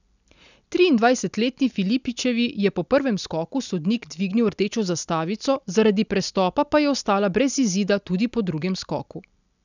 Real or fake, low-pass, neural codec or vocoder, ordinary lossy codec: real; 7.2 kHz; none; none